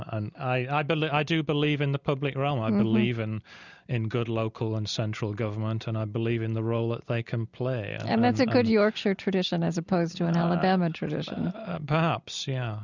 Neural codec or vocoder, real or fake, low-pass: none; real; 7.2 kHz